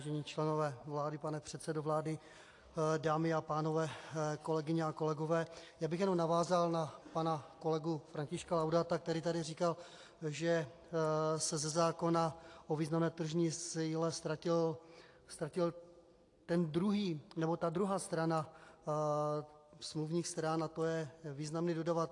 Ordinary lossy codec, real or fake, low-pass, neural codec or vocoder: AAC, 48 kbps; real; 10.8 kHz; none